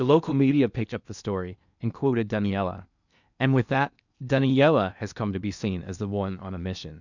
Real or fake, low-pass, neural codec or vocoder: fake; 7.2 kHz; codec, 16 kHz in and 24 kHz out, 0.8 kbps, FocalCodec, streaming, 65536 codes